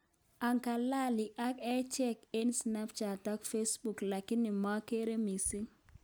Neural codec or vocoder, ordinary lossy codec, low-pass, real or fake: none; none; none; real